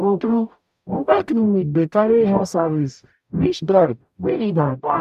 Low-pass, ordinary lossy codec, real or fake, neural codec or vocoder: 14.4 kHz; none; fake; codec, 44.1 kHz, 0.9 kbps, DAC